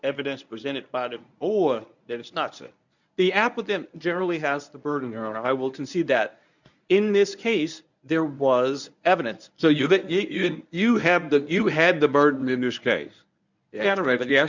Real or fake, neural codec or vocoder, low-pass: fake; codec, 24 kHz, 0.9 kbps, WavTokenizer, medium speech release version 1; 7.2 kHz